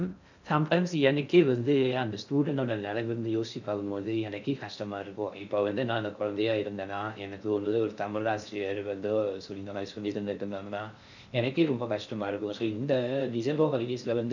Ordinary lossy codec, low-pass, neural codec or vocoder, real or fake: none; 7.2 kHz; codec, 16 kHz in and 24 kHz out, 0.6 kbps, FocalCodec, streaming, 2048 codes; fake